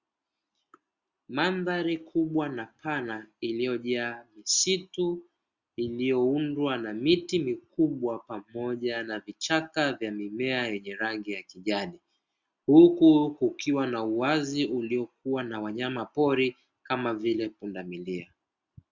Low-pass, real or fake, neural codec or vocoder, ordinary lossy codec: 7.2 kHz; real; none; Opus, 64 kbps